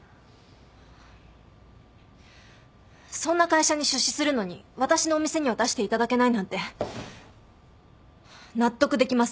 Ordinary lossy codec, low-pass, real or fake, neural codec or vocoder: none; none; real; none